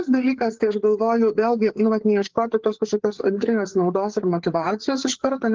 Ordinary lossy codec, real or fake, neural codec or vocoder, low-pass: Opus, 16 kbps; fake; codec, 16 kHz, 4 kbps, FreqCodec, larger model; 7.2 kHz